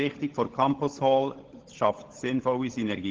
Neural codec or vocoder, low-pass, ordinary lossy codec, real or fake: codec, 16 kHz, 16 kbps, FunCodec, trained on LibriTTS, 50 frames a second; 7.2 kHz; Opus, 16 kbps; fake